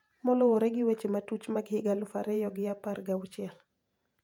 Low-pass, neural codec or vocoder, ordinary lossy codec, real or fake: 19.8 kHz; vocoder, 48 kHz, 128 mel bands, Vocos; none; fake